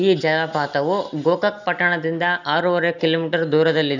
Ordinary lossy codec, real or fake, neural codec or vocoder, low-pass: none; real; none; 7.2 kHz